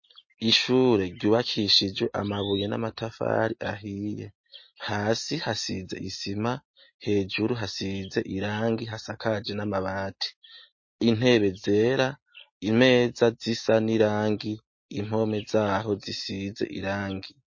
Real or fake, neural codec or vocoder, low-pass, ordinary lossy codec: real; none; 7.2 kHz; MP3, 32 kbps